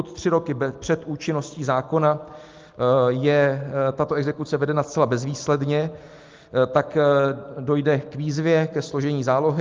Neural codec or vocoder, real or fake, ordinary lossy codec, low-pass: none; real; Opus, 32 kbps; 7.2 kHz